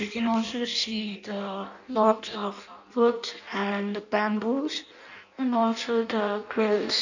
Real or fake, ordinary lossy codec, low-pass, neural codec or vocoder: fake; none; 7.2 kHz; codec, 16 kHz in and 24 kHz out, 0.6 kbps, FireRedTTS-2 codec